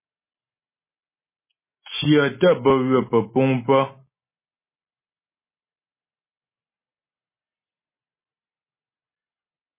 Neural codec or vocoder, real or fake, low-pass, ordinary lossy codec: none; real; 3.6 kHz; MP3, 16 kbps